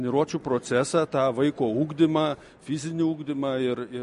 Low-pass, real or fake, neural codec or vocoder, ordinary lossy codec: 14.4 kHz; real; none; MP3, 48 kbps